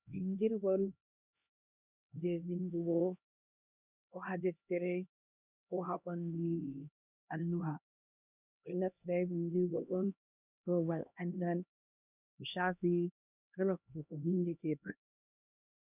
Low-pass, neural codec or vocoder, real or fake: 3.6 kHz; codec, 16 kHz, 1 kbps, X-Codec, HuBERT features, trained on LibriSpeech; fake